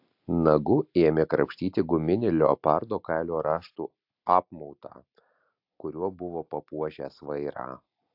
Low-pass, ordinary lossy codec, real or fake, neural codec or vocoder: 5.4 kHz; AAC, 48 kbps; real; none